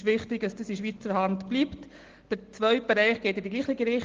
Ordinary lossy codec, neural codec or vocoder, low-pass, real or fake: Opus, 16 kbps; none; 7.2 kHz; real